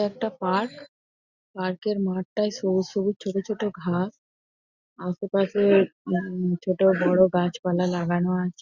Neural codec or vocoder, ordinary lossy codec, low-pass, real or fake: none; Opus, 64 kbps; 7.2 kHz; real